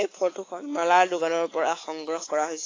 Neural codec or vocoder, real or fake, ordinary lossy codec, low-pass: codec, 24 kHz, 3.1 kbps, DualCodec; fake; AAC, 32 kbps; 7.2 kHz